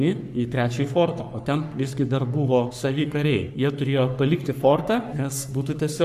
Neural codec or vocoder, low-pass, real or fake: codec, 44.1 kHz, 3.4 kbps, Pupu-Codec; 14.4 kHz; fake